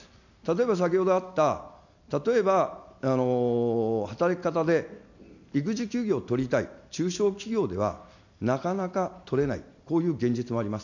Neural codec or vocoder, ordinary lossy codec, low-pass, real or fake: none; none; 7.2 kHz; real